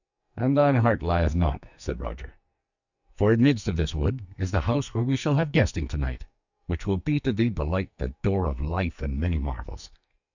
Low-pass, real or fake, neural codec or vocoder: 7.2 kHz; fake; codec, 44.1 kHz, 2.6 kbps, SNAC